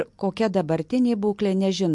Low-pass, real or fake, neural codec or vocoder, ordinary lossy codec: 10.8 kHz; real; none; MP3, 64 kbps